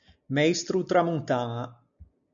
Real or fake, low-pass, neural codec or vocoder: real; 7.2 kHz; none